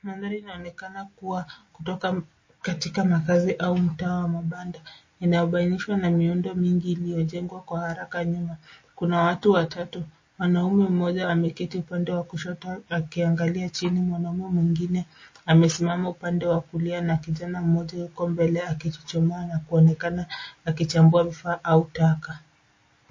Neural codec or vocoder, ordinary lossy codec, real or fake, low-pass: none; MP3, 32 kbps; real; 7.2 kHz